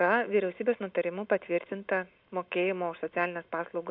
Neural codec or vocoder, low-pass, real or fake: none; 5.4 kHz; real